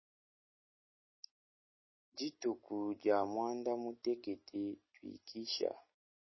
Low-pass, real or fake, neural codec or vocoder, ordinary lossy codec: 7.2 kHz; real; none; MP3, 24 kbps